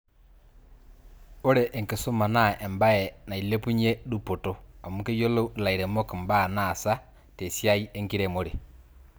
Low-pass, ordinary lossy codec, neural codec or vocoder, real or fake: none; none; none; real